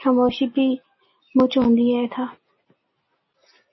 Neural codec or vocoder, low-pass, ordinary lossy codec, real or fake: none; 7.2 kHz; MP3, 24 kbps; real